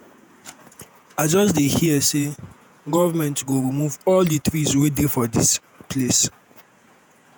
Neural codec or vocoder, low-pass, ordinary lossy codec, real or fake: vocoder, 48 kHz, 128 mel bands, Vocos; none; none; fake